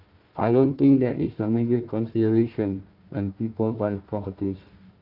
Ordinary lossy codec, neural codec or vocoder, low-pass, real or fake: Opus, 32 kbps; codec, 16 kHz, 1 kbps, FunCodec, trained on Chinese and English, 50 frames a second; 5.4 kHz; fake